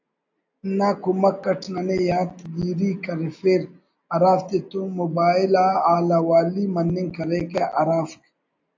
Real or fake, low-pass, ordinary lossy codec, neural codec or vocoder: real; 7.2 kHz; AAC, 48 kbps; none